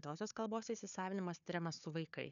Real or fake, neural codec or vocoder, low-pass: fake; codec, 16 kHz, 4 kbps, FunCodec, trained on Chinese and English, 50 frames a second; 7.2 kHz